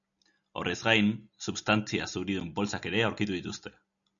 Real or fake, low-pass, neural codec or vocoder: real; 7.2 kHz; none